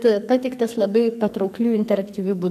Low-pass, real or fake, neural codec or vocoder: 14.4 kHz; fake; codec, 44.1 kHz, 2.6 kbps, SNAC